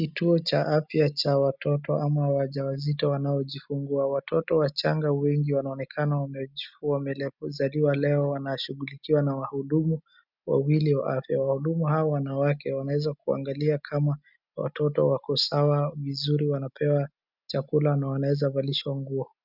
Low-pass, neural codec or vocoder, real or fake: 5.4 kHz; none; real